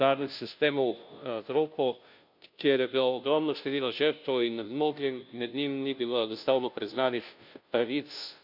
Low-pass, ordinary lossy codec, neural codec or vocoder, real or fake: 5.4 kHz; none; codec, 16 kHz, 0.5 kbps, FunCodec, trained on Chinese and English, 25 frames a second; fake